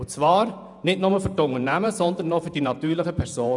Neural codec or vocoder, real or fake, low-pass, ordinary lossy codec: vocoder, 48 kHz, 128 mel bands, Vocos; fake; 10.8 kHz; none